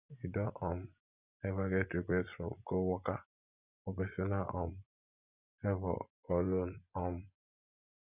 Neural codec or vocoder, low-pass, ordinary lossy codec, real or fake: vocoder, 24 kHz, 100 mel bands, Vocos; 3.6 kHz; none; fake